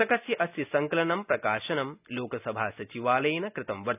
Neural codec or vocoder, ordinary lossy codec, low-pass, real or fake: none; none; 3.6 kHz; real